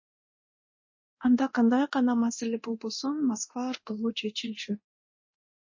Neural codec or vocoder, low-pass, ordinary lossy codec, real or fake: codec, 24 kHz, 0.9 kbps, DualCodec; 7.2 kHz; MP3, 32 kbps; fake